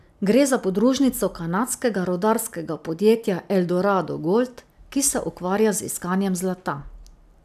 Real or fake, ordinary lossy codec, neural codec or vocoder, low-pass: real; none; none; 14.4 kHz